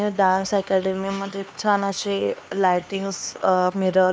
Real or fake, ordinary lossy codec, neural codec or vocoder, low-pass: fake; none; codec, 16 kHz, 2 kbps, X-Codec, HuBERT features, trained on LibriSpeech; none